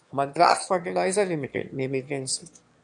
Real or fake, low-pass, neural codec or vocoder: fake; 9.9 kHz; autoencoder, 22.05 kHz, a latent of 192 numbers a frame, VITS, trained on one speaker